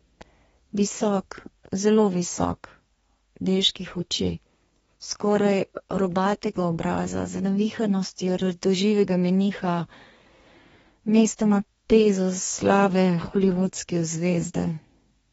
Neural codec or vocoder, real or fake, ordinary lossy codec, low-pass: codec, 32 kHz, 1.9 kbps, SNAC; fake; AAC, 24 kbps; 14.4 kHz